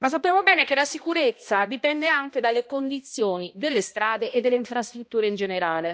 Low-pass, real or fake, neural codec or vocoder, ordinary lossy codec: none; fake; codec, 16 kHz, 1 kbps, X-Codec, HuBERT features, trained on balanced general audio; none